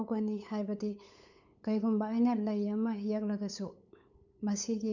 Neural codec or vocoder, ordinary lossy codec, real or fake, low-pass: codec, 16 kHz, 8 kbps, FunCodec, trained on LibriTTS, 25 frames a second; none; fake; 7.2 kHz